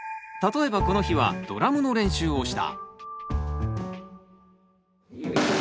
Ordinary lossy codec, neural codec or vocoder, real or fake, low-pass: none; none; real; none